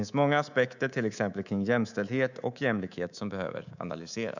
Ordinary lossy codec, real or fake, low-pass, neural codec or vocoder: none; fake; 7.2 kHz; codec, 24 kHz, 3.1 kbps, DualCodec